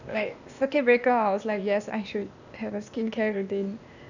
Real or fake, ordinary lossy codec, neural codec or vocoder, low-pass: fake; none; codec, 16 kHz, 0.8 kbps, ZipCodec; 7.2 kHz